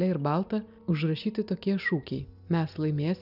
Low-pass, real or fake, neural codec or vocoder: 5.4 kHz; real; none